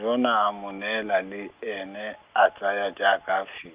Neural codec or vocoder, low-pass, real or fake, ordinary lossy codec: none; 3.6 kHz; real; Opus, 32 kbps